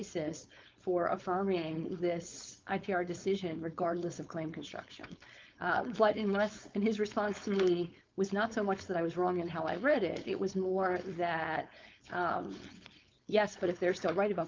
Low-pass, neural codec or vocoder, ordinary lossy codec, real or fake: 7.2 kHz; codec, 16 kHz, 4.8 kbps, FACodec; Opus, 32 kbps; fake